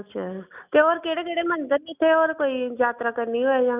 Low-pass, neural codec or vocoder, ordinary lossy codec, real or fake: 3.6 kHz; none; none; real